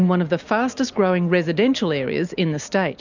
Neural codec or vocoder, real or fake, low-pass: none; real; 7.2 kHz